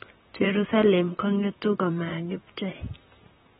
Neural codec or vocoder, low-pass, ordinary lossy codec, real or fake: vocoder, 44.1 kHz, 128 mel bands, Pupu-Vocoder; 19.8 kHz; AAC, 16 kbps; fake